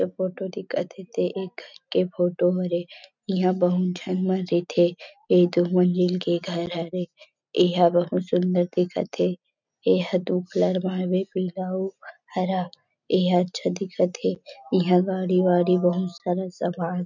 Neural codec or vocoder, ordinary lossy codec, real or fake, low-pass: none; none; real; 7.2 kHz